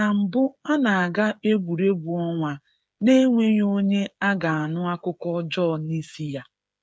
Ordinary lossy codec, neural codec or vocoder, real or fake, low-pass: none; codec, 16 kHz, 8 kbps, FreqCodec, smaller model; fake; none